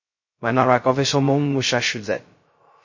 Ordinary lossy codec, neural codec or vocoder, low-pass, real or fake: MP3, 32 kbps; codec, 16 kHz, 0.2 kbps, FocalCodec; 7.2 kHz; fake